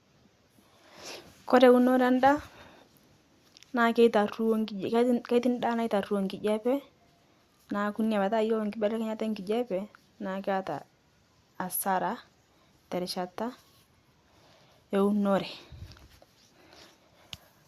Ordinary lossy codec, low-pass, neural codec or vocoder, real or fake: Opus, 64 kbps; 14.4 kHz; none; real